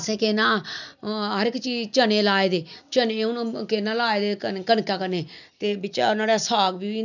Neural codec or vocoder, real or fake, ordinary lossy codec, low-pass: none; real; none; 7.2 kHz